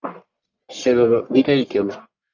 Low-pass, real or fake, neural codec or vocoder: 7.2 kHz; fake; codec, 44.1 kHz, 1.7 kbps, Pupu-Codec